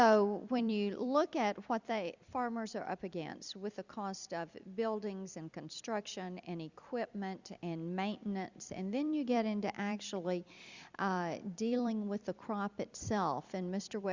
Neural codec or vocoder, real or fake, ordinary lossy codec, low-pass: vocoder, 44.1 kHz, 128 mel bands every 256 samples, BigVGAN v2; fake; Opus, 64 kbps; 7.2 kHz